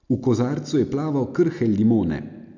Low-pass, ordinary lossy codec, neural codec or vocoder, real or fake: 7.2 kHz; none; none; real